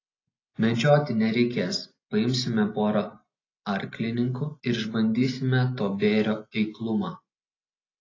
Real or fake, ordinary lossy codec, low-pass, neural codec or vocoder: real; AAC, 32 kbps; 7.2 kHz; none